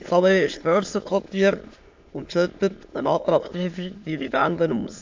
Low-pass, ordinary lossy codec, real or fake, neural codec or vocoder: 7.2 kHz; AAC, 48 kbps; fake; autoencoder, 22.05 kHz, a latent of 192 numbers a frame, VITS, trained on many speakers